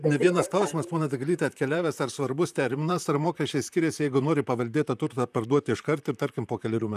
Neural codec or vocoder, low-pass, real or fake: vocoder, 44.1 kHz, 128 mel bands, Pupu-Vocoder; 14.4 kHz; fake